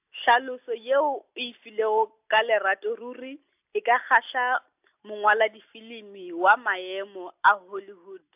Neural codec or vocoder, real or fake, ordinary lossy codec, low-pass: none; real; AAC, 32 kbps; 3.6 kHz